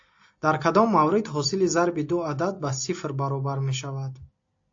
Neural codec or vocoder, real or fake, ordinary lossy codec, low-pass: none; real; AAC, 48 kbps; 7.2 kHz